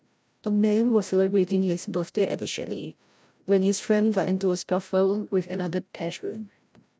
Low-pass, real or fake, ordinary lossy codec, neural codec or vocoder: none; fake; none; codec, 16 kHz, 0.5 kbps, FreqCodec, larger model